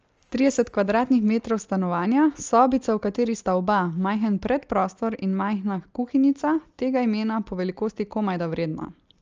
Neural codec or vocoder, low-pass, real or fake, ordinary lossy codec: none; 7.2 kHz; real; Opus, 24 kbps